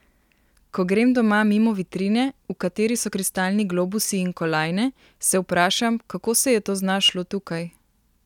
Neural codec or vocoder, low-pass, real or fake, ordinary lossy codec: none; 19.8 kHz; real; none